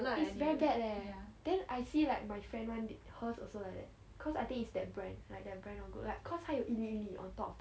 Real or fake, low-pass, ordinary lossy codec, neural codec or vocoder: real; none; none; none